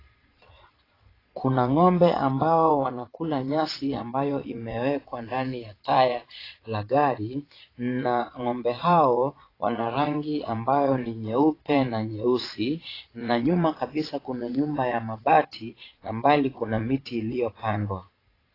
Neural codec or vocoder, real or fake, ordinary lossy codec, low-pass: vocoder, 22.05 kHz, 80 mel bands, Vocos; fake; AAC, 24 kbps; 5.4 kHz